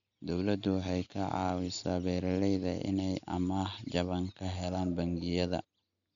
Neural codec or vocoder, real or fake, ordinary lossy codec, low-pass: none; real; none; 7.2 kHz